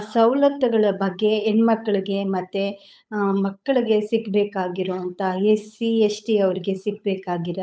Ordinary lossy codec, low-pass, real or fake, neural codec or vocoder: none; none; fake; codec, 16 kHz, 8 kbps, FunCodec, trained on Chinese and English, 25 frames a second